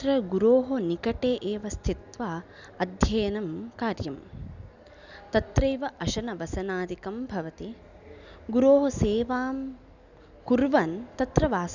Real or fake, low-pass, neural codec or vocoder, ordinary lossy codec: real; 7.2 kHz; none; none